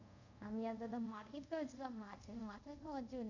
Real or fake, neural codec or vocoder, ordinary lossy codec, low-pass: fake; codec, 24 kHz, 0.5 kbps, DualCodec; none; 7.2 kHz